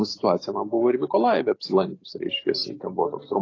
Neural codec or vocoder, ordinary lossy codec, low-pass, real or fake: none; AAC, 32 kbps; 7.2 kHz; real